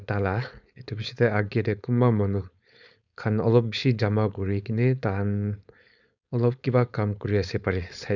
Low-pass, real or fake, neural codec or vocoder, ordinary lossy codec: 7.2 kHz; fake; codec, 16 kHz, 4.8 kbps, FACodec; none